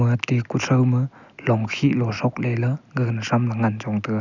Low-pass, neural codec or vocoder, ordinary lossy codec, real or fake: 7.2 kHz; none; none; real